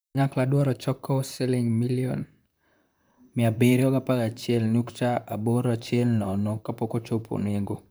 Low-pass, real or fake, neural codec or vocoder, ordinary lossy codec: none; fake; vocoder, 44.1 kHz, 128 mel bands, Pupu-Vocoder; none